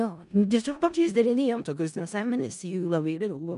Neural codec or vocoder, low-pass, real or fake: codec, 16 kHz in and 24 kHz out, 0.4 kbps, LongCat-Audio-Codec, four codebook decoder; 10.8 kHz; fake